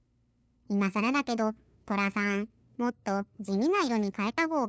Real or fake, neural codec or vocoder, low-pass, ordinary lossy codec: fake; codec, 16 kHz, 2 kbps, FunCodec, trained on LibriTTS, 25 frames a second; none; none